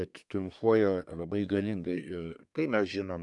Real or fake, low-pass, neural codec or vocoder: fake; 10.8 kHz; codec, 24 kHz, 1 kbps, SNAC